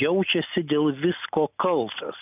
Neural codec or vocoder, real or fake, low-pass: none; real; 3.6 kHz